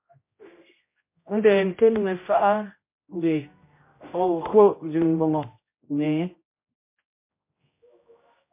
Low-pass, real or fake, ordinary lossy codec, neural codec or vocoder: 3.6 kHz; fake; MP3, 24 kbps; codec, 16 kHz, 0.5 kbps, X-Codec, HuBERT features, trained on general audio